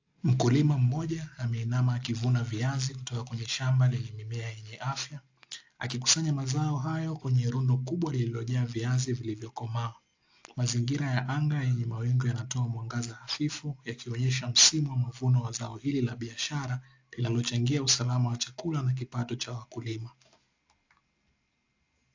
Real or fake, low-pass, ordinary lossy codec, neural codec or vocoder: real; 7.2 kHz; AAC, 48 kbps; none